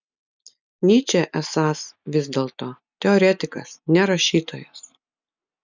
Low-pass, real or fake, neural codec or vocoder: 7.2 kHz; real; none